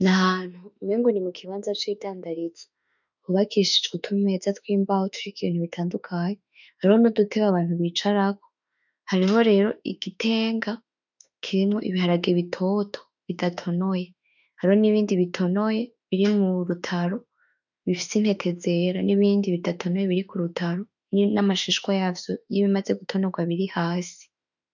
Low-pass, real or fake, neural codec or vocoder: 7.2 kHz; fake; autoencoder, 48 kHz, 32 numbers a frame, DAC-VAE, trained on Japanese speech